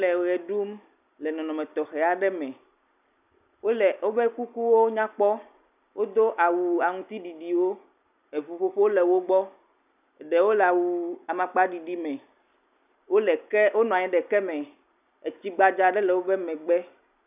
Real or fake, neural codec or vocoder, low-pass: real; none; 3.6 kHz